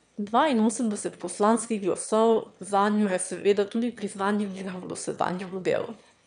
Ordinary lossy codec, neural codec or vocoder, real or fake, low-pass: MP3, 96 kbps; autoencoder, 22.05 kHz, a latent of 192 numbers a frame, VITS, trained on one speaker; fake; 9.9 kHz